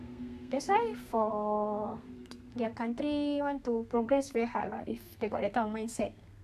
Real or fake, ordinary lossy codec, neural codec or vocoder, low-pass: fake; none; codec, 32 kHz, 1.9 kbps, SNAC; 14.4 kHz